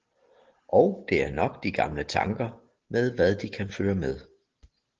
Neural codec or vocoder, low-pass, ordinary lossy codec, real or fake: none; 7.2 kHz; Opus, 16 kbps; real